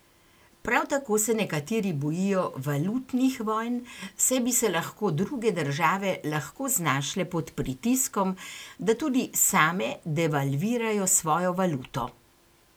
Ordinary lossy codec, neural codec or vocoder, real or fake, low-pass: none; none; real; none